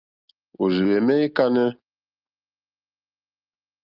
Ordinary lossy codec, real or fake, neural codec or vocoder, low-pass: Opus, 24 kbps; real; none; 5.4 kHz